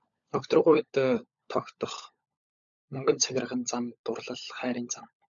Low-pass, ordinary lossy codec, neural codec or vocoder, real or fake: 7.2 kHz; MP3, 96 kbps; codec, 16 kHz, 16 kbps, FunCodec, trained on LibriTTS, 50 frames a second; fake